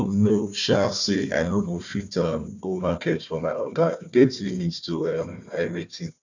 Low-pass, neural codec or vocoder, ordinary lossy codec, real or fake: 7.2 kHz; codec, 16 kHz, 1 kbps, FunCodec, trained on Chinese and English, 50 frames a second; none; fake